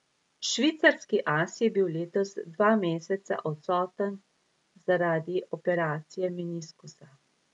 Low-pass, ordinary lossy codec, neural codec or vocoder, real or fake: 10.8 kHz; MP3, 96 kbps; none; real